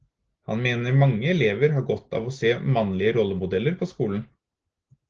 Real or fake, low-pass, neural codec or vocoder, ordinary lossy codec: real; 7.2 kHz; none; Opus, 16 kbps